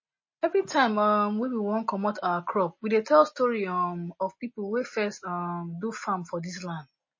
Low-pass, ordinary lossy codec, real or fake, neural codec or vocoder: 7.2 kHz; MP3, 32 kbps; real; none